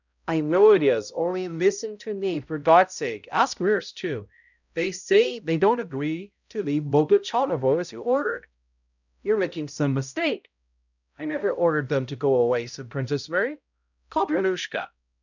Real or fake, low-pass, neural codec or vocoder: fake; 7.2 kHz; codec, 16 kHz, 0.5 kbps, X-Codec, HuBERT features, trained on balanced general audio